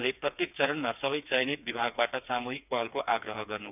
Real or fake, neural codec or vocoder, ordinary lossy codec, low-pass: fake; codec, 16 kHz, 4 kbps, FreqCodec, smaller model; none; 3.6 kHz